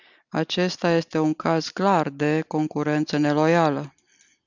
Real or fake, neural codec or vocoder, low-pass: real; none; 7.2 kHz